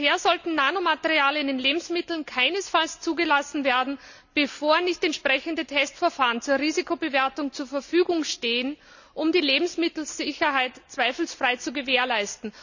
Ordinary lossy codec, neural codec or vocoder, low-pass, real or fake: none; none; 7.2 kHz; real